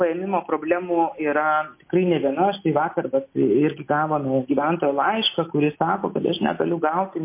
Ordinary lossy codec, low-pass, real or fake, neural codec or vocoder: MP3, 24 kbps; 3.6 kHz; real; none